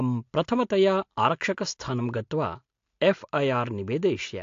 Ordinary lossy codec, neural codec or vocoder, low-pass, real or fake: AAC, 48 kbps; none; 7.2 kHz; real